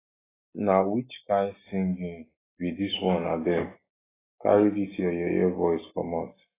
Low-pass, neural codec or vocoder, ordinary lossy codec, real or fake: 3.6 kHz; none; AAC, 16 kbps; real